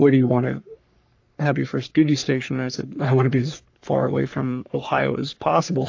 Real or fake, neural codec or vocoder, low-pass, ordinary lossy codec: fake; codec, 44.1 kHz, 3.4 kbps, Pupu-Codec; 7.2 kHz; AAC, 48 kbps